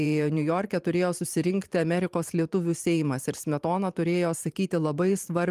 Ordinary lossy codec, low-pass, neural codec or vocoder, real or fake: Opus, 32 kbps; 14.4 kHz; vocoder, 44.1 kHz, 128 mel bands every 512 samples, BigVGAN v2; fake